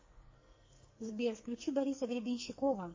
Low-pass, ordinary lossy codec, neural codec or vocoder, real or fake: 7.2 kHz; MP3, 32 kbps; codec, 44.1 kHz, 2.6 kbps, SNAC; fake